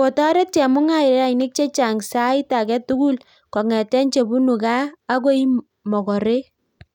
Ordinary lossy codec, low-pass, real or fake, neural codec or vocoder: none; 19.8 kHz; real; none